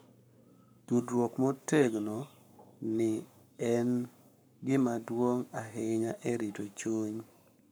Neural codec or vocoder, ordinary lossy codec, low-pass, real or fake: codec, 44.1 kHz, 7.8 kbps, Pupu-Codec; none; none; fake